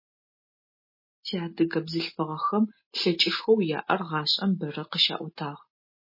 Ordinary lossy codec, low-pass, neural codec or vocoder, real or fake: MP3, 24 kbps; 5.4 kHz; none; real